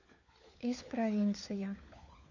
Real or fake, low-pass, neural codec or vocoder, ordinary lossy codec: fake; 7.2 kHz; codec, 16 kHz, 4 kbps, FunCodec, trained on LibriTTS, 50 frames a second; none